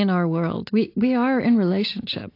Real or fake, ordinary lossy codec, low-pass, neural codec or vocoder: real; AAC, 32 kbps; 5.4 kHz; none